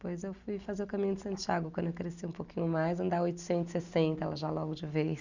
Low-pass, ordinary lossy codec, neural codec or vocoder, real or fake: 7.2 kHz; none; none; real